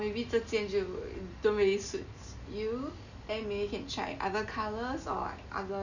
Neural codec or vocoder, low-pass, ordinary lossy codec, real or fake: none; 7.2 kHz; none; real